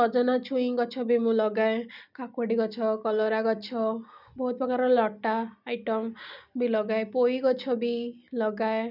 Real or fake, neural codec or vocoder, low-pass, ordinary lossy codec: real; none; 5.4 kHz; none